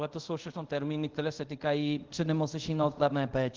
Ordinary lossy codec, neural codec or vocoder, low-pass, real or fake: Opus, 16 kbps; codec, 24 kHz, 0.5 kbps, DualCodec; 7.2 kHz; fake